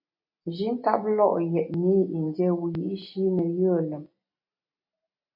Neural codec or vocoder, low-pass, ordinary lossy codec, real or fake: none; 5.4 kHz; MP3, 32 kbps; real